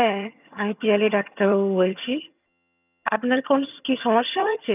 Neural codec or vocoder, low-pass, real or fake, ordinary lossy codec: vocoder, 22.05 kHz, 80 mel bands, HiFi-GAN; 3.6 kHz; fake; none